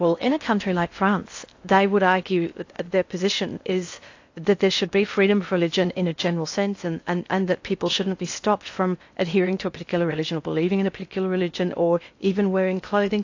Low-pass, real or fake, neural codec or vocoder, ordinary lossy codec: 7.2 kHz; fake; codec, 16 kHz in and 24 kHz out, 0.6 kbps, FocalCodec, streaming, 4096 codes; AAC, 48 kbps